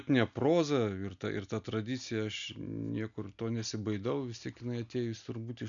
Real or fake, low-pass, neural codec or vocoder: real; 7.2 kHz; none